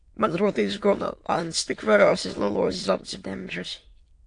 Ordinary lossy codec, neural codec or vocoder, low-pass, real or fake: AAC, 48 kbps; autoencoder, 22.05 kHz, a latent of 192 numbers a frame, VITS, trained on many speakers; 9.9 kHz; fake